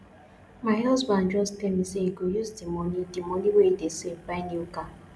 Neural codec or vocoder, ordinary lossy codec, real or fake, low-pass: none; none; real; none